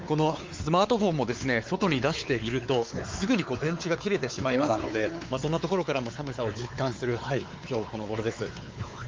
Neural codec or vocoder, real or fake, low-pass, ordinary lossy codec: codec, 16 kHz, 4 kbps, X-Codec, WavLM features, trained on Multilingual LibriSpeech; fake; 7.2 kHz; Opus, 32 kbps